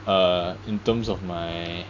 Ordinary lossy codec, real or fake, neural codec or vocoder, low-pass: AAC, 48 kbps; real; none; 7.2 kHz